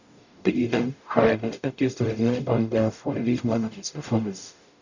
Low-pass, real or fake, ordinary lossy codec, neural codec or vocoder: 7.2 kHz; fake; none; codec, 44.1 kHz, 0.9 kbps, DAC